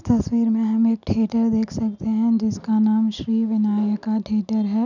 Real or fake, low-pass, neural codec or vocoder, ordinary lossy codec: real; 7.2 kHz; none; none